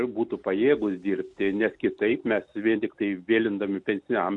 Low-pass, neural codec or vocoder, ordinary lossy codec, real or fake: 10.8 kHz; none; MP3, 96 kbps; real